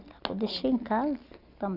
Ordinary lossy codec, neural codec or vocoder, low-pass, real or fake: none; none; 5.4 kHz; real